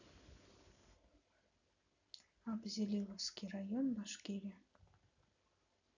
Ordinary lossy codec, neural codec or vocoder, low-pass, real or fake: none; none; 7.2 kHz; real